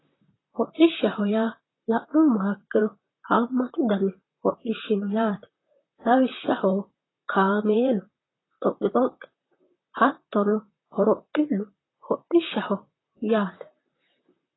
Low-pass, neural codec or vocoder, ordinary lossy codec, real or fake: 7.2 kHz; vocoder, 44.1 kHz, 128 mel bands, Pupu-Vocoder; AAC, 16 kbps; fake